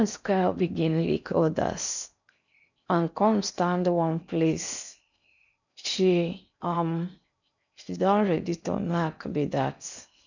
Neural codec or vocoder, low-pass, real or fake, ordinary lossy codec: codec, 16 kHz in and 24 kHz out, 0.6 kbps, FocalCodec, streaming, 4096 codes; 7.2 kHz; fake; none